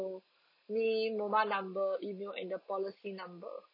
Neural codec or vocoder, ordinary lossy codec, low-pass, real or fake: none; none; 5.4 kHz; real